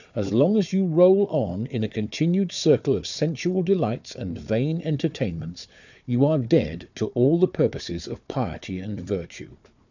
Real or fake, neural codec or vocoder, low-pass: fake; codec, 16 kHz, 4 kbps, FunCodec, trained on Chinese and English, 50 frames a second; 7.2 kHz